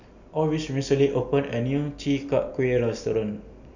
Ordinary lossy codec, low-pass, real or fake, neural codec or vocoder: none; 7.2 kHz; real; none